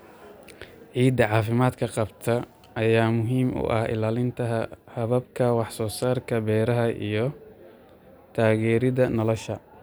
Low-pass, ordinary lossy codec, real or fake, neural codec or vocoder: none; none; real; none